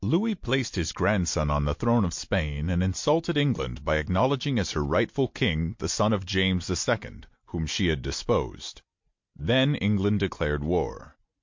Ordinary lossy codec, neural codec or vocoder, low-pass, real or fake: MP3, 48 kbps; none; 7.2 kHz; real